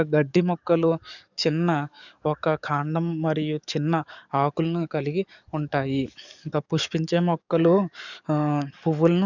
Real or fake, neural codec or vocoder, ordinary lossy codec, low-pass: fake; codec, 44.1 kHz, 7.8 kbps, DAC; none; 7.2 kHz